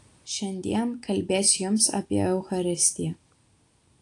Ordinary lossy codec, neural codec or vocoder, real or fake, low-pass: AAC, 48 kbps; none; real; 10.8 kHz